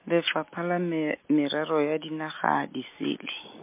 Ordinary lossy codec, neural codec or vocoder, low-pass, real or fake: MP3, 24 kbps; none; 3.6 kHz; real